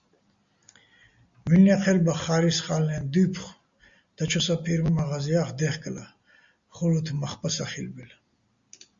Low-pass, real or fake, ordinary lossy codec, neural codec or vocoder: 7.2 kHz; real; Opus, 64 kbps; none